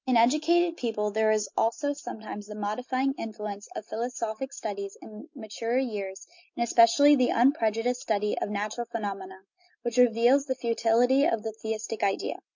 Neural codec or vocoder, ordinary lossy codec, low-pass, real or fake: none; MP3, 48 kbps; 7.2 kHz; real